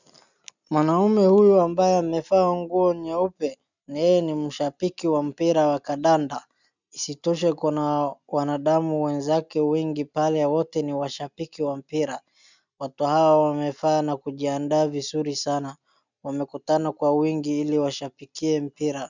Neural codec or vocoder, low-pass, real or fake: none; 7.2 kHz; real